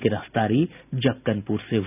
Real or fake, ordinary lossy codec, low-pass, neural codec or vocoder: real; none; 3.6 kHz; none